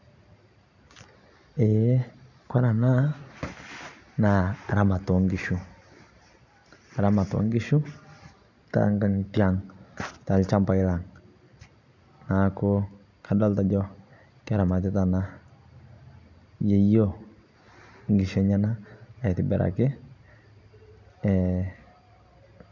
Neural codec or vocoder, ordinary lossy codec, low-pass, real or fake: none; none; 7.2 kHz; real